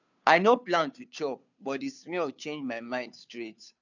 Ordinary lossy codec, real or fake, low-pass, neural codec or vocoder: none; fake; 7.2 kHz; codec, 16 kHz, 2 kbps, FunCodec, trained on Chinese and English, 25 frames a second